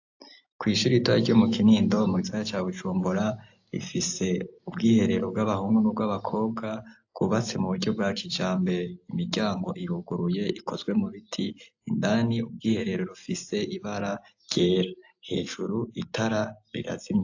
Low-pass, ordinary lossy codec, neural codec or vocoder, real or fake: 7.2 kHz; AAC, 48 kbps; none; real